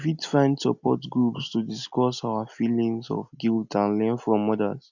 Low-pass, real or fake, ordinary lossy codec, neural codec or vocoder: 7.2 kHz; real; none; none